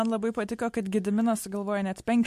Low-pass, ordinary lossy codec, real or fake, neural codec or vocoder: 14.4 kHz; MP3, 64 kbps; real; none